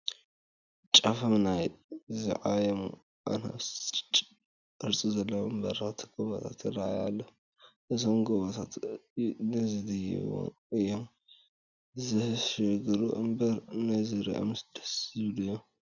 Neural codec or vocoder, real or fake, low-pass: none; real; 7.2 kHz